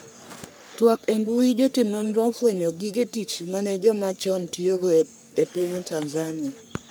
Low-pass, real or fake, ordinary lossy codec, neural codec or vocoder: none; fake; none; codec, 44.1 kHz, 3.4 kbps, Pupu-Codec